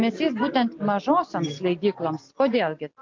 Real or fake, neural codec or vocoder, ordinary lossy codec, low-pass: real; none; MP3, 48 kbps; 7.2 kHz